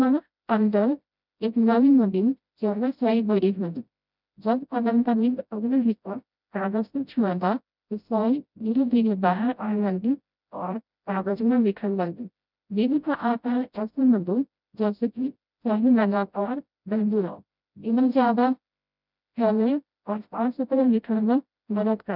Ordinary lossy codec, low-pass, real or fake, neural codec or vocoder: none; 5.4 kHz; fake; codec, 16 kHz, 0.5 kbps, FreqCodec, smaller model